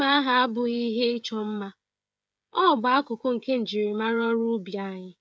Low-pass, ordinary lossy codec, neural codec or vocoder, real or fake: none; none; codec, 16 kHz, 16 kbps, FreqCodec, smaller model; fake